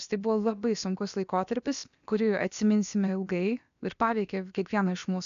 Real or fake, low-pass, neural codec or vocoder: fake; 7.2 kHz; codec, 16 kHz, about 1 kbps, DyCAST, with the encoder's durations